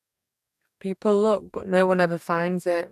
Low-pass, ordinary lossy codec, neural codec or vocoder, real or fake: 14.4 kHz; AAC, 96 kbps; codec, 44.1 kHz, 2.6 kbps, DAC; fake